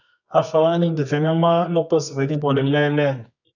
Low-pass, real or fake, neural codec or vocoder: 7.2 kHz; fake; codec, 24 kHz, 0.9 kbps, WavTokenizer, medium music audio release